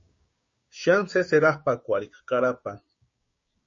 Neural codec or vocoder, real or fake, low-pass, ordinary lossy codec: codec, 16 kHz, 6 kbps, DAC; fake; 7.2 kHz; MP3, 32 kbps